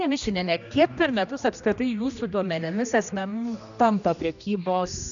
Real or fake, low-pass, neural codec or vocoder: fake; 7.2 kHz; codec, 16 kHz, 1 kbps, X-Codec, HuBERT features, trained on general audio